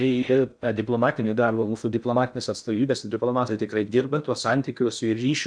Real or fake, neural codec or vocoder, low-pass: fake; codec, 16 kHz in and 24 kHz out, 0.6 kbps, FocalCodec, streaming, 4096 codes; 9.9 kHz